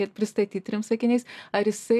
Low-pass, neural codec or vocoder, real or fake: 14.4 kHz; none; real